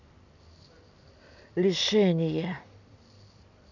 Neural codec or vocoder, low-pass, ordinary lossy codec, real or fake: none; 7.2 kHz; none; real